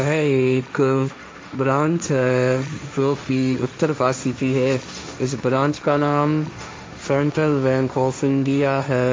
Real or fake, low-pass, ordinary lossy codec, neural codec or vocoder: fake; none; none; codec, 16 kHz, 1.1 kbps, Voila-Tokenizer